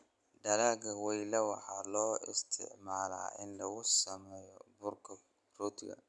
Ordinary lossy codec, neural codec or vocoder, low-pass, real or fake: none; none; none; real